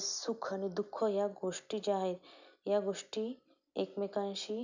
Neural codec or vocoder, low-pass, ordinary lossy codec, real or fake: none; 7.2 kHz; none; real